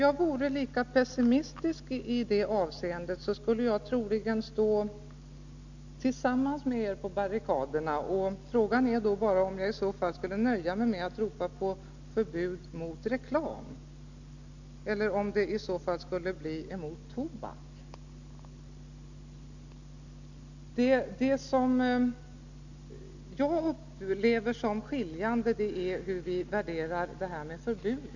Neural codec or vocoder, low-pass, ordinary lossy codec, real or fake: none; 7.2 kHz; none; real